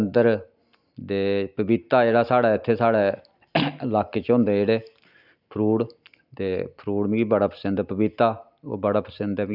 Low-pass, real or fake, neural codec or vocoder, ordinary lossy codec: 5.4 kHz; real; none; none